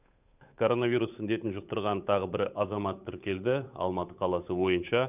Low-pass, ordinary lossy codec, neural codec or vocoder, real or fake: 3.6 kHz; none; codec, 16 kHz, 8 kbps, FunCodec, trained on Chinese and English, 25 frames a second; fake